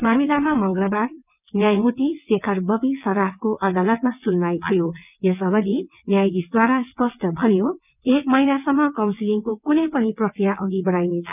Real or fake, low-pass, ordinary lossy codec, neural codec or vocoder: fake; 3.6 kHz; none; vocoder, 22.05 kHz, 80 mel bands, WaveNeXt